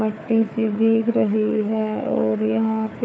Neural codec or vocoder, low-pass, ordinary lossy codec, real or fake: codec, 16 kHz, 4 kbps, FunCodec, trained on Chinese and English, 50 frames a second; none; none; fake